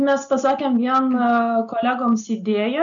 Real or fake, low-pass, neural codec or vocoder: real; 7.2 kHz; none